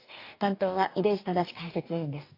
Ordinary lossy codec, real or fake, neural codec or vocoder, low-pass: AAC, 32 kbps; fake; codec, 44.1 kHz, 2.6 kbps, DAC; 5.4 kHz